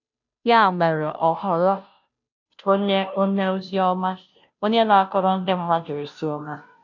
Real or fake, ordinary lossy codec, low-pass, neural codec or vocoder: fake; none; 7.2 kHz; codec, 16 kHz, 0.5 kbps, FunCodec, trained on Chinese and English, 25 frames a second